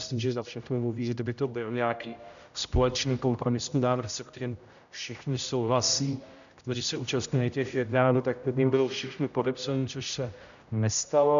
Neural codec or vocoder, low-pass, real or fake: codec, 16 kHz, 0.5 kbps, X-Codec, HuBERT features, trained on general audio; 7.2 kHz; fake